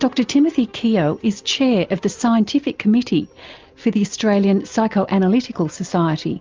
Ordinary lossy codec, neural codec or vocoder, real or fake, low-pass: Opus, 24 kbps; none; real; 7.2 kHz